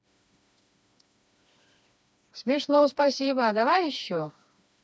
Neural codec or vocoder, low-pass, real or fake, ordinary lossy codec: codec, 16 kHz, 2 kbps, FreqCodec, smaller model; none; fake; none